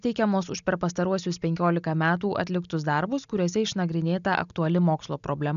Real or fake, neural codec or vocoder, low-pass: real; none; 7.2 kHz